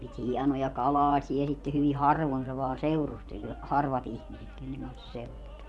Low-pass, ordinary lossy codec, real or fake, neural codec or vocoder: none; none; fake; vocoder, 24 kHz, 100 mel bands, Vocos